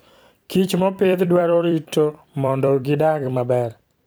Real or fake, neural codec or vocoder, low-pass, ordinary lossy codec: fake; vocoder, 44.1 kHz, 128 mel bands every 256 samples, BigVGAN v2; none; none